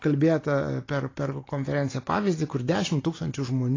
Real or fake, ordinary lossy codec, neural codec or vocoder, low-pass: real; AAC, 32 kbps; none; 7.2 kHz